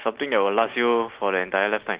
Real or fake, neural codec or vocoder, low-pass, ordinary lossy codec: real; none; 3.6 kHz; Opus, 16 kbps